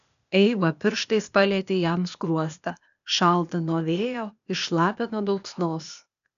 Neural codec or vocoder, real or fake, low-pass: codec, 16 kHz, 0.8 kbps, ZipCodec; fake; 7.2 kHz